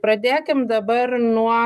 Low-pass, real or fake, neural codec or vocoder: 14.4 kHz; real; none